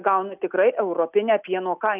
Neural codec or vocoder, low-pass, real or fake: none; 3.6 kHz; real